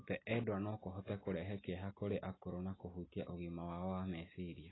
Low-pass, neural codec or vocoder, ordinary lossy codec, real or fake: 7.2 kHz; none; AAC, 16 kbps; real